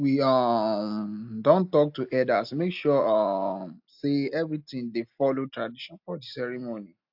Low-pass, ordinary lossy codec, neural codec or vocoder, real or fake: 5.4 kHz; AAC, 48 kbps; none; real